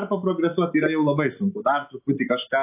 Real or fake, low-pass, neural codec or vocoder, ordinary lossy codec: real; 3.6 kHz; none; AAC, 24 kbps